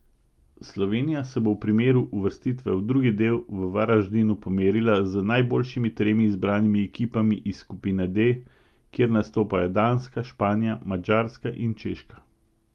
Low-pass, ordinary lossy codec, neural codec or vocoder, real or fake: 19.8 kHz; Opus, 32 kbps; none; real